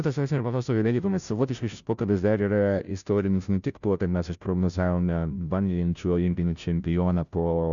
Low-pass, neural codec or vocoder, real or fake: 7.2 kHz; codec, 16 kHz, 0.5 kbps, FunCodec, trained on Chinese and English, 25 frames a second; fake